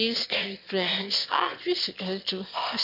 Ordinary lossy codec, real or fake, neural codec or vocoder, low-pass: none; fake; autoencoder, 22.05 kHz, a latent of 192 numbers a frame, VITS, trained on one speaker; 5.4 kHz